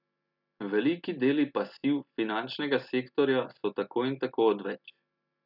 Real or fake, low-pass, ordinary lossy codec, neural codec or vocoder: real; 5.4 kHz; none; none